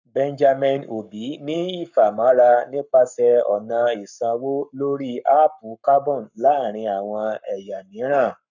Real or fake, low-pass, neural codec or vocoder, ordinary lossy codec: fake; 7.2 kHz; codec, 44.1 kHz, 7.8 kbps, Pupu-Codec; none